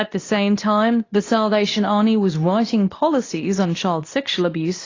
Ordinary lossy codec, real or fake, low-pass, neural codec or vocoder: AAC, 32 kbps; fake; 7.2 kHz; codec, 24 kHz, 0.9 kbps, WavTokenizer, medium speech release version 2